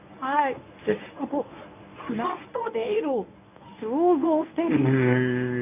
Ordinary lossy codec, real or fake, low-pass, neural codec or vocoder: none; fake; 3.6 kHz; codec, 24 kHz, 0.9 kbps, WavTokenizer, medium speech release version 1